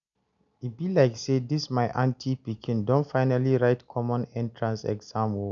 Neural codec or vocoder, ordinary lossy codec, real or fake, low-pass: none; none; real; 7.2 kHz